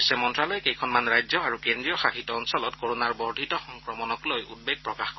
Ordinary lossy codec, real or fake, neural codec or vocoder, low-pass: MP3, 24 kbps; real; none; 7.2 kHz